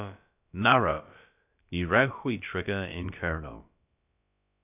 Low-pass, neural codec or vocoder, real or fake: 3.6 kHz; codec, 16 kHz, about 1 kbps, DyCAST, with the encoder's durations; fake